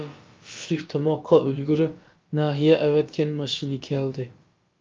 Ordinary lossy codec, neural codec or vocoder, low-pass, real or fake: Opus, 24 kbps; codec, 16 kHz, about 1 kbps, DyCAST, with the encoder's durations; 7.2 kHz; fake